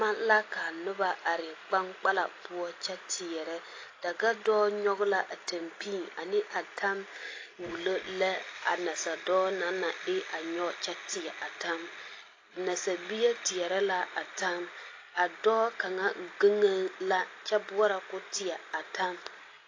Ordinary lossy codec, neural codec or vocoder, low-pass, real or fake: AAC, 32 kbps; none; 7.2 kHz; real